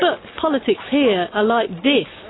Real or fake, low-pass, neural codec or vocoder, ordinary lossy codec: real; 7.2 kHz; none; AAC, 16 kbps